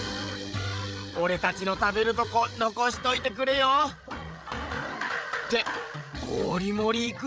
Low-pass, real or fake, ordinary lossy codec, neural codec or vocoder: none; fake; none; codec, 16 kHz, 8 kbps, FreqCodec, larger model